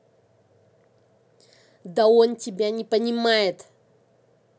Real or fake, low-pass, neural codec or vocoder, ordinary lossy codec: real; none; none; none